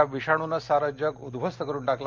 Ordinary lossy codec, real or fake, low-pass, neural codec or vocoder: Opus, 32 kbps; real; 7.2 kHz; none